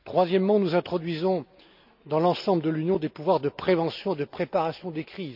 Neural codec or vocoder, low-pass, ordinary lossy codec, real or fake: none; 5.4 kHz; none; real